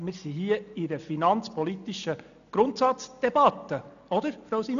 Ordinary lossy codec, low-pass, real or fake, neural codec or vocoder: none; 7.2 kHz; real; none